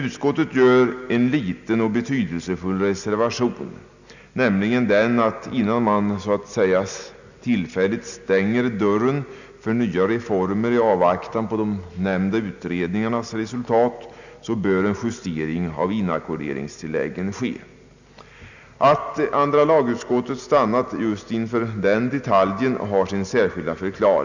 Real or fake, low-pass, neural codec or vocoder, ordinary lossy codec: real; 7.2 kHz; none; none